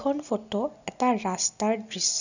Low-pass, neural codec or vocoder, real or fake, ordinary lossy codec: 7.2 kHz; none; real; none